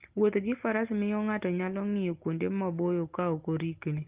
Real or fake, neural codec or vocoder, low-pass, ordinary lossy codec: real; none; 3.6 kHz; Opus, 32 kbps